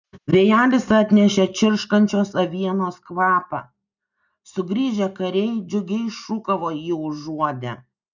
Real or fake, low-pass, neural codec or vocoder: real; 7.2 kHz; none